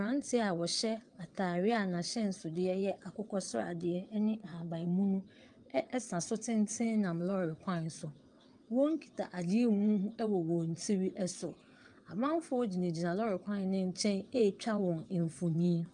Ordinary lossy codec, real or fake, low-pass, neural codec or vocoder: Opus, 32 kbps; fake; 9.9 kHz; vocoder, 22.05 kHz, 80 mel bands, WaveNeXt